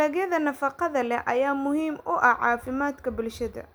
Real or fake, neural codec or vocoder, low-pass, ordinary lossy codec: real; none; none; none